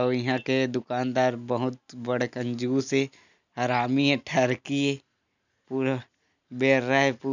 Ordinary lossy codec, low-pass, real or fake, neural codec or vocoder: none; 7.2 kHz; real; none